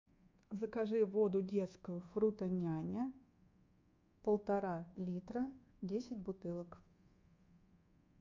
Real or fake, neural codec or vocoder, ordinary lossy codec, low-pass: fake; codec, 24 kHz, 1.2 kbps, DualCodec; MP3, 48 kbps; 7.2 kHz